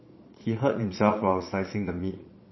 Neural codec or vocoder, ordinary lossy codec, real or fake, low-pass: vocoder, 22.05 kHz, 80 mel bands, Vocos; MP3, 24 kbps; fake; 7.2 kHz